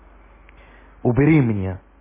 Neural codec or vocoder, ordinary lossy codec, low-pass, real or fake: none; MP3, 16 kbps; 3.6 kHz; real